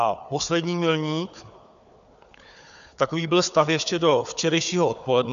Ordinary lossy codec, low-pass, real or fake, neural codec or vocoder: AAC, 96 kbps; 7.2 kHz; fake; codec, 16 kHz, 4 kbps, FunCodec, trained on Chinese and English, 50 frames a second